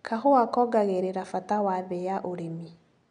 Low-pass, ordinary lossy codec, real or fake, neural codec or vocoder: 9.9 kHz; none; real; none